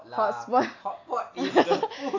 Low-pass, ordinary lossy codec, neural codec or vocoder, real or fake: 7.2 kHz; AAC, 48 kbps; none; real